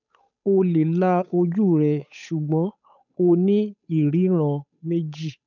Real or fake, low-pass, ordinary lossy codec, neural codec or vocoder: fake; 7.2 kHz; none; codec, 16 kHz, 8 kbps, FunCodec, trained on Chinese and English, 25 frames a second